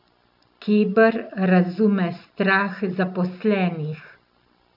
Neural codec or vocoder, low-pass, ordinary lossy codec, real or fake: none; 5.4 kHz; none; real